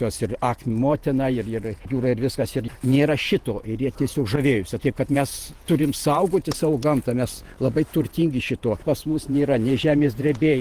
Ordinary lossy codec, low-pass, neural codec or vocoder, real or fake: Opus, 24 kbps; 14.4 kHz; vocoder, 48 kHz, 128 mel bands, Vocos; fake